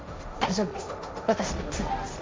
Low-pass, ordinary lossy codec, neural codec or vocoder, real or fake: none; none; codec, 16 kHz, 1.1 kbps, Voila-Tokenizer; fake